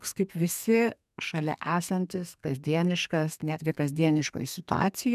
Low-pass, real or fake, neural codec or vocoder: 14.4 kHz; fake; codec, 32 kHz, 1.9 kbps, SNAC